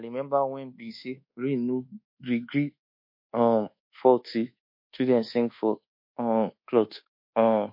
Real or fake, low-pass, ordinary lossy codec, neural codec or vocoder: fake; 5.4 kHz; MP3, 32 kbps; codec, 24 kHz, 1.2 kbps, DualCodec